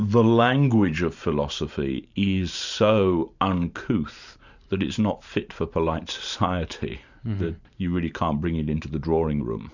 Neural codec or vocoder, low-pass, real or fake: vocoder, 44.1 kHz, 128 mel bands every 512 samples, BigVGAN v2; 7.2 kHz; fake